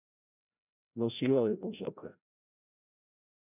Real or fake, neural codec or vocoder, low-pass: fake; codec, 16 kHz, 0.5 kbps, FreqCodec, larger model; 3.6 kHz